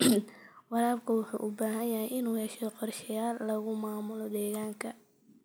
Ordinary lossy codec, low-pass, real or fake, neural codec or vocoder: none; none; real; none